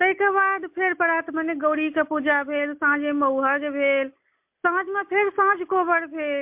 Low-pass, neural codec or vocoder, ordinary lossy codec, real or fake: 3.6 kHz; none; MP3, 32 kbps; real